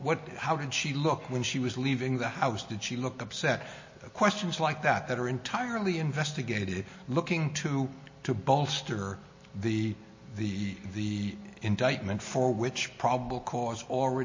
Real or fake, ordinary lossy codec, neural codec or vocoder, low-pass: real; MP3, 32 kbps; none; 7.2 kHz